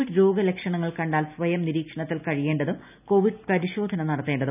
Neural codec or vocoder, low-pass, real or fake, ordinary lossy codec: none; 3.6 kHz; real; none